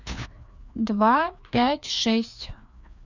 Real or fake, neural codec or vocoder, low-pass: fake; codec, 16 kHz, 2 kbps, FreqCodec, larger model; 7.2 kHz